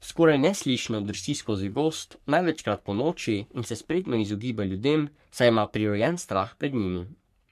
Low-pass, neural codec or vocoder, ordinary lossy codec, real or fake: 14.4 kHz; codec, 44.1 kHz, 3.4 kbps, Pupu-Codec; MP3, 96 kbps; fake